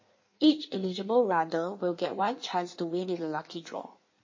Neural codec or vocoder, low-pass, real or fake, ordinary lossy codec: codec, 16 kHz in and 24 kHz out, 1.1 kbps, FireRedTTS-2 codec; 7.2 kHz; fake; MP3, 32 kbps